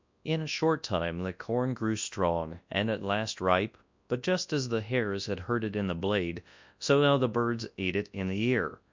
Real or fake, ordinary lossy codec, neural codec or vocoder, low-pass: fake; MP3, 64 kbps; codec, 24 kHz, 0.9 kbps, WavTokenizer, large speech release; 7.2 kHz